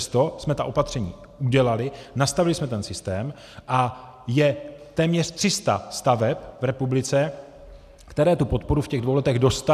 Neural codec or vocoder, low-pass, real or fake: none; 14.4 kHz; real